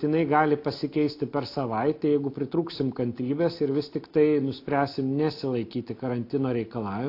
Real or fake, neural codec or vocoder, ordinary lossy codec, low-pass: real; none; AAC, 32 kbps; 5.4 kHz